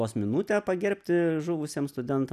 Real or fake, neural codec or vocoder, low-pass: real; none; 14.4 kHz